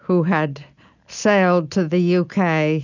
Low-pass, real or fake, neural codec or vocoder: 7.2 kHz; real; none